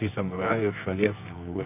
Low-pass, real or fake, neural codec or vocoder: 3.6 kHz; fake; codec, 24 kHz, 0.9 kbps, WavTokenizer, medium music audio release